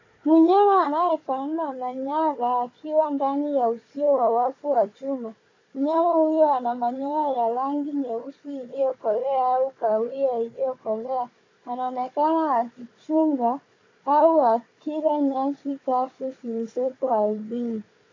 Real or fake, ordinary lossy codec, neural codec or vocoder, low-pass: fake; AAC, 32 kbps; codec, 16 kHz, 4 kbps, FunCodec, trained on Chinese and English, 50 frames a second; 7.2 kHz